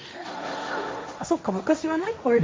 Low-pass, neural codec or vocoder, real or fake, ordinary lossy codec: none; codec, 16 kHz, 1.1 kbps, Voila-Tokenizer; fake; none